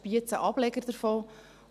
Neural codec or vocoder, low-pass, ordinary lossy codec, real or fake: none; 14.4 kHz; none; real